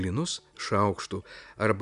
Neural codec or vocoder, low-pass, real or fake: none; 10.8 kHz; real